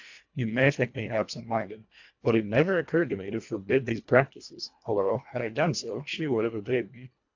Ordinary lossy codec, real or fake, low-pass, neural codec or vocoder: AAC, 48 kbps; fake; 7.2 kHz; codec, 24 kHz, 1.5 kbps, HILCodec